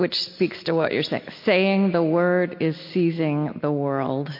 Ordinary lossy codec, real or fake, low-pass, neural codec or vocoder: MP3, 32 kbps; real; 5.4 kHz; none